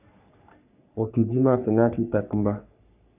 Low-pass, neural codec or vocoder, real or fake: 3.6 kHz; codec, 44.1 kHz, 3.4 kbps, Pupu-Codec; fake